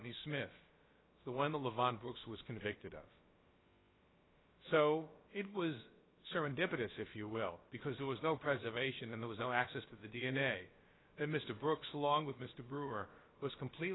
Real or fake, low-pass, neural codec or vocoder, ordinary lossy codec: fake; 7.2 kHz; codec, 16 kHz, about 1 kbps, DyCAST, with the encoder's durations; AAC, 16 kbps